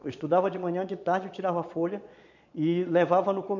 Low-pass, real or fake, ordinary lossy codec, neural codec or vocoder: 7.2 kHz; fake; none; vocoder, 22.05 kHz, 80 mel bands, Vocos